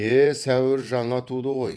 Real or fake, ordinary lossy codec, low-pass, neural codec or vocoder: fake; none; none; vocoder, 22.05 kHz, 80 mel bands, WaveNeXt